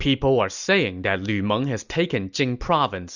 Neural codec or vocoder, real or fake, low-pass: none; real; 7.2 kHz